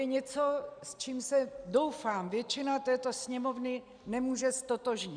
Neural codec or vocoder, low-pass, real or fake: none; 9.9 kHz; real